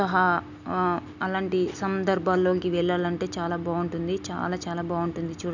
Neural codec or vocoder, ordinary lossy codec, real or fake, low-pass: none; none; real; 7.2 kHz